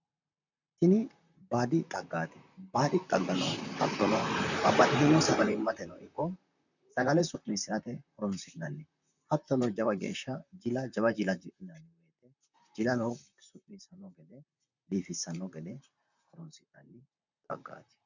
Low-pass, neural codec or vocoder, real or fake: 7.2 kHz; vocoder, 44.1 kHz, 128 mel bands, Pupu-Vocoder; fake